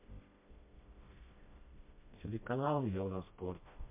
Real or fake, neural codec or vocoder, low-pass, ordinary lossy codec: fake; codec, 16 kHz, 1 kbps, FreqCodec, smaller model; 3.6 kHz; MP3, 24 kbps